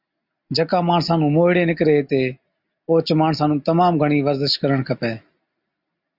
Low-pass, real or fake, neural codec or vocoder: 5.4 kHz; real; none